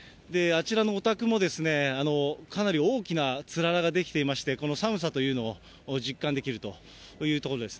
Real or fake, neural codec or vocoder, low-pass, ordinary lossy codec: real; none; none; none